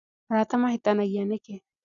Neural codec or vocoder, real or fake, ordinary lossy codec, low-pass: none; real; none; 7.2 kHz